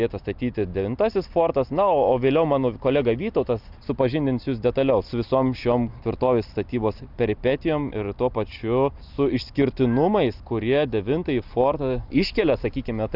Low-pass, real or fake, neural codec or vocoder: 5.4 kHz; real; none